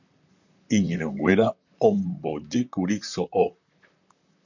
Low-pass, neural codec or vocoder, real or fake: 7.2 kHz; vocoder, 44.1 kHz, 128 mel bands, Pupu-Vocoder; fake